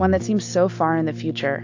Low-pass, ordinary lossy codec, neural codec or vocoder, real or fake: 7.2 kHz; AAC, 48 kbps; none; real